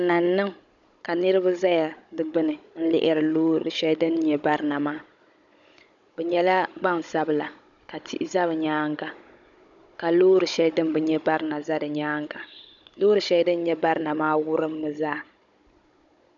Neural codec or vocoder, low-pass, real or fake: codec, 16 kHz, 16 kbps, FunCodec, trained on Chinese and English, 50 frames a second; 7.2 kHz; fake